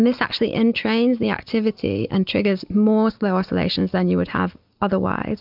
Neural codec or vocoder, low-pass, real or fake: none; 5.4 kHz; real